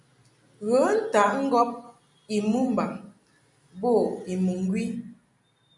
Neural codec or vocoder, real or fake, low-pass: none; real; 10.8 kHz